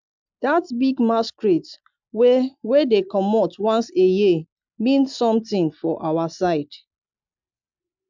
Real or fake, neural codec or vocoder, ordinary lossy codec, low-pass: real; none; MP3, 64 kbps; 7.2 kHz